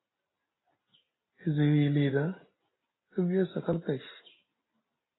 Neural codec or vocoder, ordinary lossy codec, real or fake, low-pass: none; AAC, 16 kbps; real; 7.2 kHz